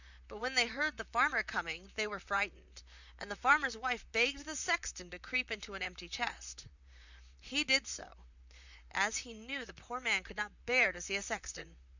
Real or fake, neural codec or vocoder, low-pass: fake; vocoder, 44.1 kHz, 128 mel bands, Pupu-Vocoder; 7.2 kHz